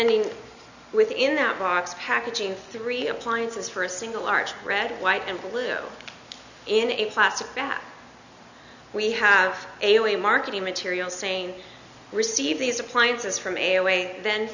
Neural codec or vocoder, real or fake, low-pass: none; real; 7.2 kHz